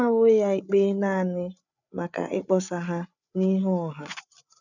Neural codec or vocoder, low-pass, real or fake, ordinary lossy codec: codec, 16 kHz, 8 kbps, FreqCodec, larger model; 7.2 kHz; fake; none